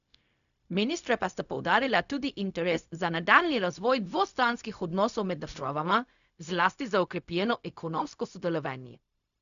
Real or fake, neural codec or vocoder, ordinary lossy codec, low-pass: fake; codec, 16 kHz, 0.4 kbps, LongCat-Audio-Codec; none; 7.2 kHz